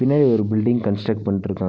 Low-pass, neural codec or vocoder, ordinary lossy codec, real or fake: none; none; none; real